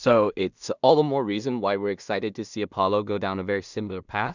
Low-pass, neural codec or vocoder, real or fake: 7.2 kHz; codec, 16 kHz in and 24 kHz out, 0.4 kbps, LongCat-Audio-Codec, two codebook decoder; fake